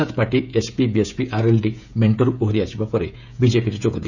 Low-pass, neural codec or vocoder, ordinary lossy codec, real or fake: 7.2 kHz; codec, 16 kHz, 16 kbps, FreqCodec, smaller model; none; fake